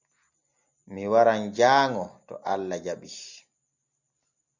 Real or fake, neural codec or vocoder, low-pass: real; none; 7.2 kHz